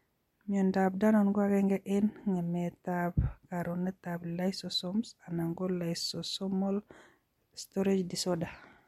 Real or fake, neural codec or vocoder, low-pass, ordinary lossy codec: real; none; 19.8 kHz; MP3, 64 kbps